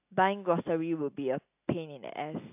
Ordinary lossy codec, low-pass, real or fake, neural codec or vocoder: AAC, 32 kbps; 3.6 kHz; real; none